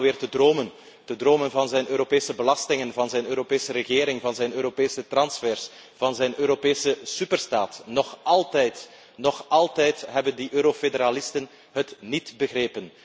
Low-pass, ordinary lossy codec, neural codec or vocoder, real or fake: none; none; none; real